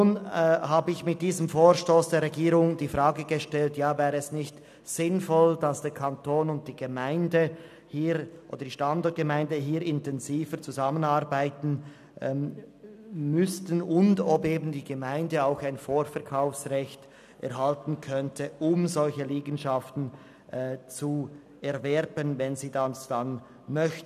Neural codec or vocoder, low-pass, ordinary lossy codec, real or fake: none; 14.4 kHz; none; real